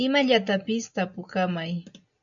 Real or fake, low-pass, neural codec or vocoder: real; 7.2 kHz; none